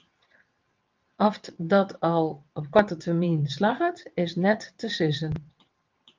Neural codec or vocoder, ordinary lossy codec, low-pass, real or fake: vocoder, 22.05 kHz, 80 mel bands, WaveNeXt; Opus, 32 kbps; 7.2 kHz; fake